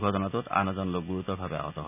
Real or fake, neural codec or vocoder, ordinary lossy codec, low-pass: real; none; none; 3.6 kHz